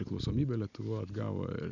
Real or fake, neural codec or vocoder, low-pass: real; none; 7.2 kHz